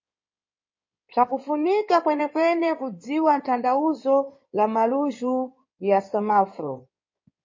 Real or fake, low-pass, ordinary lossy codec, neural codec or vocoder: fake; 7.2 kHz; MP3, 32 kbps; codec, 16 kHz in and 24 kHz out, 2.2 kbps, FireRedTTS-2 codec